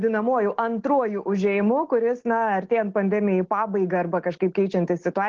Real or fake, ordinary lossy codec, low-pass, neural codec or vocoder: real; Opus, 16 kbps; 7.2 kHz; none